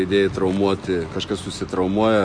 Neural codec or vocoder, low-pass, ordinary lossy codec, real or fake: none; 9.9 kHz; MP3, 48 kbps; real